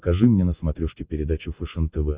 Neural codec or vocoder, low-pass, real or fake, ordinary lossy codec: none; 3.6 kHz; real; Opus, 64 kbps